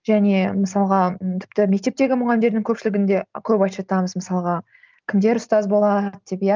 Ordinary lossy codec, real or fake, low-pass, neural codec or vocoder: Opus, 32 kbps; real; 7.2 kHz; none